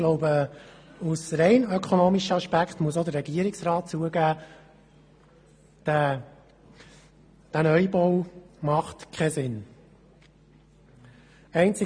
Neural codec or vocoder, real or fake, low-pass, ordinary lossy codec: none; real; 9.9 kHz; MP3, 64 kbps